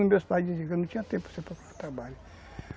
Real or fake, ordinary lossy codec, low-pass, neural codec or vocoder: real; none; none; none